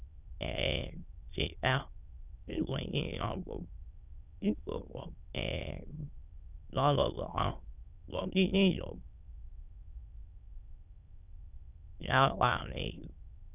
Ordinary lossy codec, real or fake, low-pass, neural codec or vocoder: none; fake; 3.6 kHz; autoencoder, 22.05 kHz, a latent of 192 numbers a frame, VITS, trained on many speakers